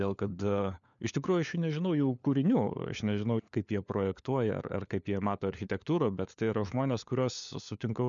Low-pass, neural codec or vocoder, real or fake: 7.2 kHz; codec, 16 kHz, 4 kbps, FunCodec, trained on LibriTTS, 50 frames a second; fake